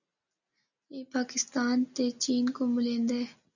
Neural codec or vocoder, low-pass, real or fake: none; 7.2 kHz; real